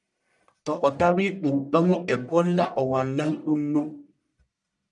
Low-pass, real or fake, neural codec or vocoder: 10.8 kHz; fake; codec, 44.1 kHz, 1.7 kbps, Pupu-Codec